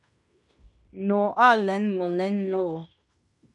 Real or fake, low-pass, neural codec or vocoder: fake; 10.8 kHz; codec, 16 kHz in and 24 kHz out, 0.9 kbps, LongCat-Audio-Codec, fine tuned four codebook decoder